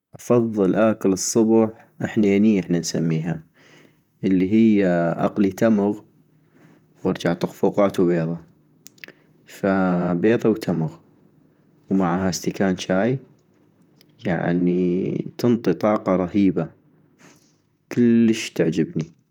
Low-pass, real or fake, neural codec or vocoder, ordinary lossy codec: 19.8 kHz; fake; vocoder, 44.1 kHz, 128 mel bands, Pupu-Vocoder; none